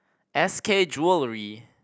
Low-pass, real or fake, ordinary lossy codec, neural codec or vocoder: none; real; none; none